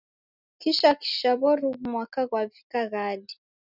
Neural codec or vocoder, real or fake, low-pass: none; real; 5.4 kHz